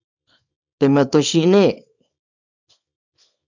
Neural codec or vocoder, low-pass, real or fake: codec, 24 kHz, 0.9 kbps, WavTokenizer, small release; 7.2 kHz; fake